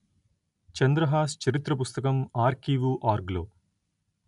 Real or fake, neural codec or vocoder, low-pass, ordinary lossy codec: real; none; 10.8 kHz; none